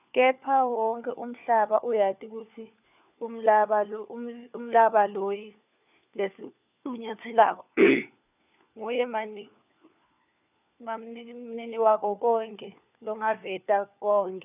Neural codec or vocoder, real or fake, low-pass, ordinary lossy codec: codec, 16 kHz, 4 kbps, FunCodec, trained on LibriTTS, 50 frames a second; fake; 3.6 kHz; none